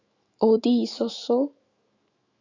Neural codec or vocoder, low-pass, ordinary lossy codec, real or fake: autoencoder, 48 kHz, 128 numbers a frame, DAC-VAE, trained on Japanese speech; 7.2 kHz; Opus, 64 kbps; fake